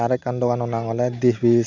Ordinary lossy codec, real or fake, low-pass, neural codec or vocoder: none; real; 7.2 kHz; none